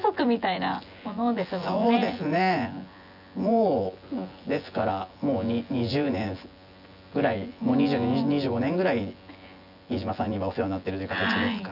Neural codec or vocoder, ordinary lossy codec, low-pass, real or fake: vocoder, 24 kHz, 100 mel bands, Vocos; none; 5.4 kHz; fake